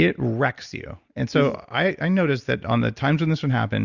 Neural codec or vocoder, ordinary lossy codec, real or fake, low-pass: none; Opus, 64 kbps; real; 7.2 kHz